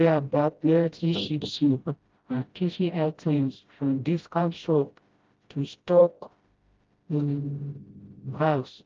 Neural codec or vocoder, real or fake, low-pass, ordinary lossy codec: codec, 16 kHz, 0.5 kbps, FreqCodec, smaller model; fake; 7.2 kHz; Opus, 24 kbps